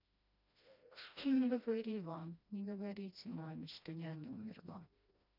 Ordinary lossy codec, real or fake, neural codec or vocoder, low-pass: none; fake; codec, 16 kHz, 1 kbps, FreqCodec, smaller model; 5.4 kHz